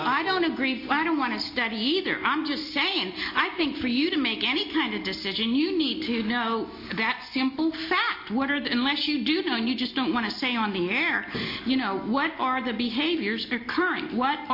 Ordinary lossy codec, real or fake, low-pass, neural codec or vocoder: MP3, 32 kbps; real; 5.4 kHz; none